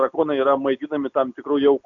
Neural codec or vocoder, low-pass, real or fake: none; 7.2 kHz; real